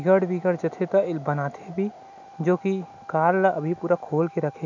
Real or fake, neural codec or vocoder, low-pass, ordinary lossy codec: real; none; 7.2 kHz; none